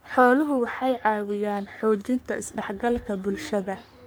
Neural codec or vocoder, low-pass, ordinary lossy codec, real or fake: codec, 44.1 kHz, 3.4 kbps, Pupu-Codec; none; none; fake